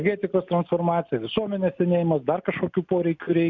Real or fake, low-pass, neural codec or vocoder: real; 7.2 kHz; none